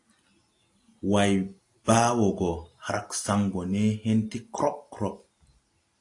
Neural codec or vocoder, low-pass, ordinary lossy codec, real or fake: none; 10.8 kHz; AAC, 48 kbps; real